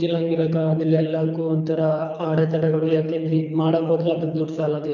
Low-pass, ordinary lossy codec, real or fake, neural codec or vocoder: 7.2 kHz; none; fake; codec, 24 kHz, 3 kbps, HILCodec